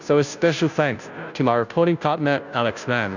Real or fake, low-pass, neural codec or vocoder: fake; 7.2 kHz; codec, 16 kHz, 0.5 kbps, FunCodec, trained on Chinese and English, 25 frames a second